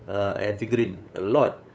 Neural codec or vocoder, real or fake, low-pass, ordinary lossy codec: codec, 16 kHz, 8 kbps, FunCodec, trained on LibriTTS, 25 frames a second; fake; none; none